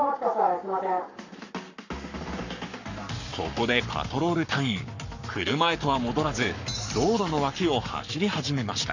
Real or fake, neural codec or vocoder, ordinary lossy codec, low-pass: fake; codec, 44.1 kHz, 7.8 kbps, Pupu-Codec; none; 7.2 kHz